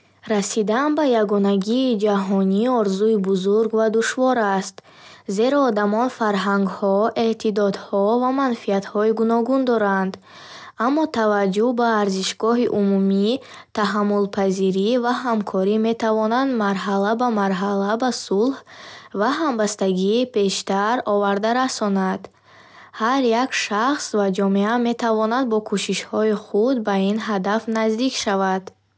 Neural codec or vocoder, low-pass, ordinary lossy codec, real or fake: none; none; none; real